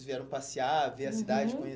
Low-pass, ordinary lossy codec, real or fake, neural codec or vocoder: none; none; real; none